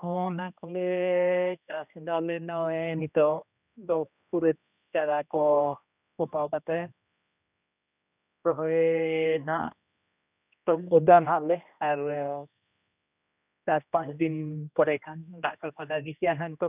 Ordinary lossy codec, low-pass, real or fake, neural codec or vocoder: none; 3.6 kHz; fake; codec, 16 kHz, 1 kbps, X-Codec, HuBERT features, trained on general audio